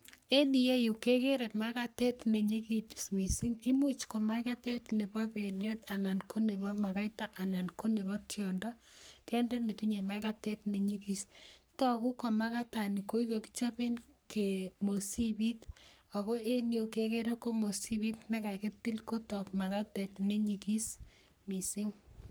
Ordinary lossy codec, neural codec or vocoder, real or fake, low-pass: none; codec, 44.1 kHz, 3.4 kbps, Pupu-Codec; fake; none